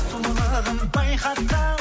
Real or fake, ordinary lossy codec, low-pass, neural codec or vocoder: real; none; none; none